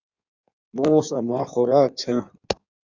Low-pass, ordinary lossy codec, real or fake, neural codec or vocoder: 7.2 kHz; Opus, 64 kbps; fake; codec, 16 kHz in and 24 kHz out, 1.1 kbps, FireRedTTS-2 codec